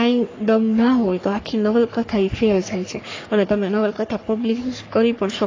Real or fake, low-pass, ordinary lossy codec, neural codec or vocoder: fake; 7.2 kHz; AAC, 32 kbps; codec, 44.1 kHz, 3.4 kbps, Pupu-Codec